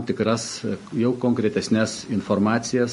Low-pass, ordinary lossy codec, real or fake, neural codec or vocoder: 10.8 kHz; MP3, 48 kbps; real; none